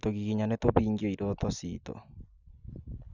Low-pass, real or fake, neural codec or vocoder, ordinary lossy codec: 7.2 kHz; real; none; none